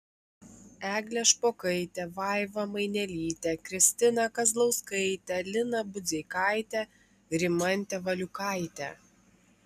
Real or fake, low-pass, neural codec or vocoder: real; 14.4 kHz; none